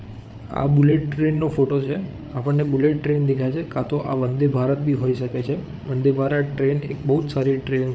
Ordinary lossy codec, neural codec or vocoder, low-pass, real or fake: none; codec, 16 kHz, 8 kbps, FreqCodec, larger model; none; fake